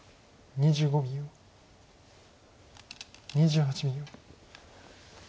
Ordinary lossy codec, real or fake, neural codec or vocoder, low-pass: none; real; none; none